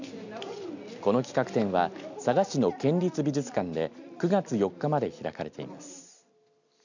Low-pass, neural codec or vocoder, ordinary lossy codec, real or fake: 7.2 kHz; none; none; real